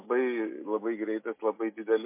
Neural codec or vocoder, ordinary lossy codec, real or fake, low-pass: none; MP3, 24 kbps; real; 3.6 kHz